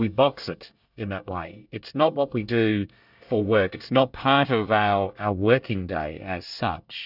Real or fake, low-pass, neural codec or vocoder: fake; 5.4 kHz; codec, 24 kHz, 1 kbps, SNAC